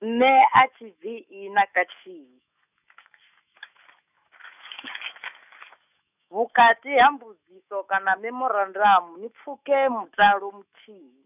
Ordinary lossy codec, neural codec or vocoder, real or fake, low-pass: none; autoencoder, 48 kHz, 128 numbers a frame, DAC-VAE, trained on Japanese speech; fake; 3.6 kHz